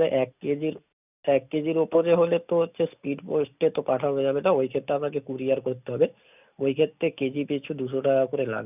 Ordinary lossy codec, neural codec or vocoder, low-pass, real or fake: none; none; 3.6 kHz; real